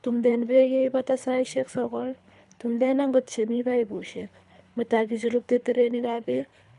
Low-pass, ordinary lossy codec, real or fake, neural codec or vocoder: 10.8 kHz; none; fake; codec, 24 kHz, 3 kbps, HILCodec